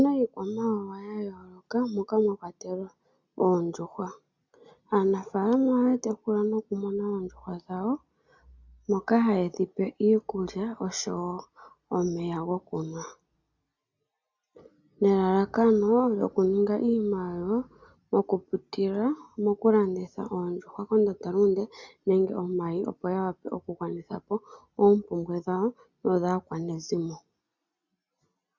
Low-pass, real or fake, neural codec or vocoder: 7.2 kHz; real; none